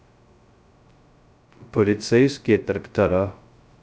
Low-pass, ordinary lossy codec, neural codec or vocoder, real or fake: none; none; codec, 16 kHz, 0.2 kbps, FocalCodec; fake